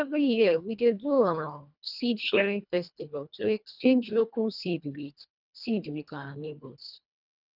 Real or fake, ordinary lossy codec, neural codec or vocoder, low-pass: fake; none; codec, 24 kHz, 1.5 kbps, HILCodec; 5.4 kHz